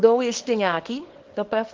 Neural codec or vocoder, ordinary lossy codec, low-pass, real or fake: codec, 24 kHz, 0.9 kbps, WavTokenizer, small release; Opus, 16 kbps; 7.2 kHz; fake